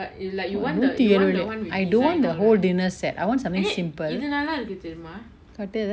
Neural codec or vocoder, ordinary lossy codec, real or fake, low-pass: none; none; real; none